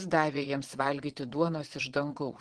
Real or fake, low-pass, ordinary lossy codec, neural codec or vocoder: fake; 9.9 kHz; Opus, 16 kbps; vocoder, 22.05 kHz, 80 mel bands, Vocos